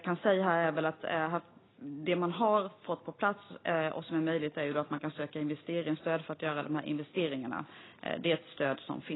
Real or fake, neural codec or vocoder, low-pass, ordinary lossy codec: real; none; 7.2 kHz; AAC, 16 kbps